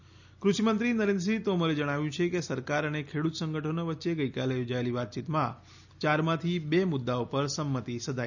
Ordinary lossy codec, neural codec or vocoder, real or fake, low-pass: none; none; real; 7.2 kHz